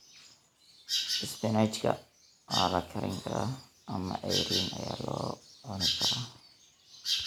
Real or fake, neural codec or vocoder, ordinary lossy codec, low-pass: real; none; none; none